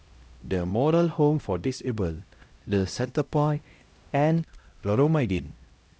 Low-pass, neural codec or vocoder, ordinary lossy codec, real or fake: none; codec, 16 kHz, 0.5 kbps, X-Codec, HuBERT features, trained on LibriSpeech; none; fake